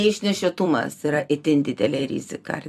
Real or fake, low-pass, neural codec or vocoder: fake; 14.4 kHz; vocoder, 44.1 kHz, 128 mel bands every 256 samples, BigVGAN v2